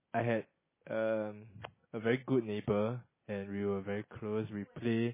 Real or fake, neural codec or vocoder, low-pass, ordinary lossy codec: real; none; 3.6 kHz; MP3, 16 kbps